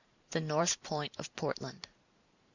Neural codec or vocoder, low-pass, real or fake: vocoder, 44.1 kHz, 128 mel bands every 512 samples, BigVGAN v2; 7.2 kHz; fake